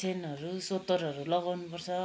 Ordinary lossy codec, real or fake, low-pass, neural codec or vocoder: none; real; none; none